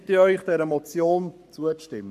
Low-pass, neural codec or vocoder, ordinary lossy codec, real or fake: 14.4 kHz; codec, 44.1 kHz, 7.8 kbps, Pupu-Codec; MP3, 64 kbps; fake